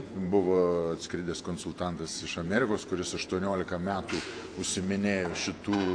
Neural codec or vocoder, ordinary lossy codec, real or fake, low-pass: vocoder, 48 kHz, 128 mel bands, Vocos; AAC, 48 kbps; fake; 9.9 kHz